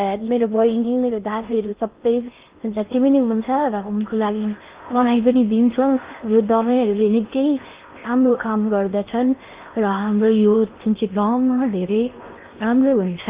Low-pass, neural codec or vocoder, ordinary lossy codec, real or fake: 3.6 kHz; codec, 16 kHz in and 24 kHz out, 0.6 kbps, FocalCodec, streaming, 4096 codes; Opus, 32 kbps; fake